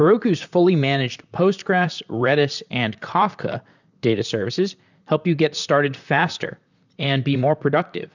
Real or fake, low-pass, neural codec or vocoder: fake; 7.2 kHz; vocoder, 44.1 kHz, 128 mel bands, Pupu-Vocoder